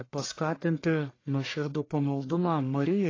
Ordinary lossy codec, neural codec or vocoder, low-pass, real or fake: AAC, 32 kbps; codec, 44.1 kHz, 1.7 kbps, Pupu-Codec; 7.2 kHz; fake